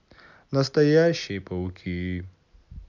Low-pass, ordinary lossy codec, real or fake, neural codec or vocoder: 7.2 kHz; none; real; none